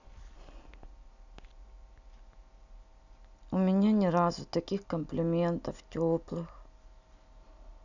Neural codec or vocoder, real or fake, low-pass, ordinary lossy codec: none; real; 7.2 kHz; none